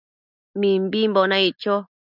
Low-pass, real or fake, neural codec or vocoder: 5.4 kHz; real; none